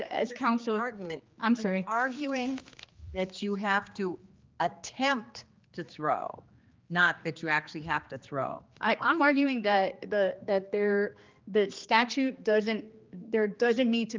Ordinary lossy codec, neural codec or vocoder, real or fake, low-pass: Opus, 24 kbps; codec, 16 kHz, 2 kbps, X-Codec, HuBERT features, trained on general audio; fake; 7.2 kHz